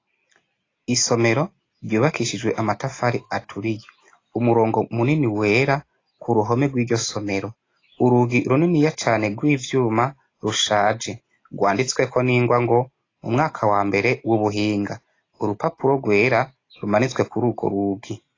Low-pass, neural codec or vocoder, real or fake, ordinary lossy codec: 7.2 kHz; none; real; AAC, 32 kbps